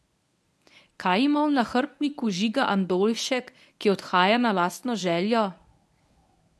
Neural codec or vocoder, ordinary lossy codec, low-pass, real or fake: codec, 24 kHz, 0.9 kbps, WavTokenizer, medium speech release version 1; none; none; fake